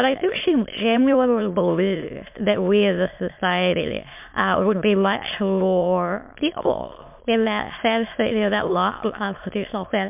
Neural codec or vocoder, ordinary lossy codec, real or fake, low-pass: autoencoder, 22.05 kHz, a latent of 192 numbers a frame, VITS, trained on many speakers; MP3, 32 kbps; fake; 3.6 kHz